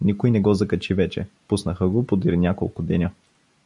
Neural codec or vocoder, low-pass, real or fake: none; 10.8 kHz; real